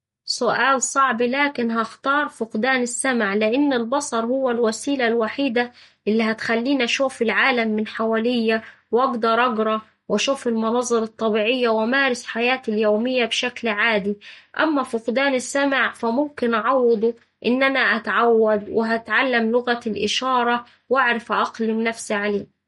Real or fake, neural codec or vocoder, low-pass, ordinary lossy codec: real; none; 19.8 kHz; MP3, 48 kbps